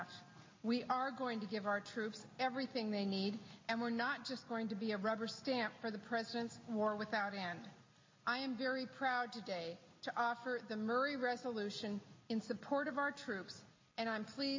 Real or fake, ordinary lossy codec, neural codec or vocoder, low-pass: real; MP3, 32 kbps; none; 7.2 kHz